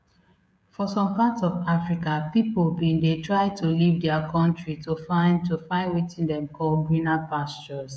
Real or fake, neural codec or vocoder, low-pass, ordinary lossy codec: fake; codec, 16 kHz, 16 kbps, FreqCodec, smaller model; none; none